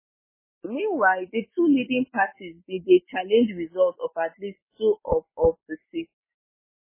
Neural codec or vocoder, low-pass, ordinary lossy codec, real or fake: none; 3.6 kHz; MP3, 16 kbps; real